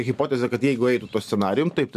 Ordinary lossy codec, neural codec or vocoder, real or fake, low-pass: MP3, 96 kbps; none; real; 14.4 kHz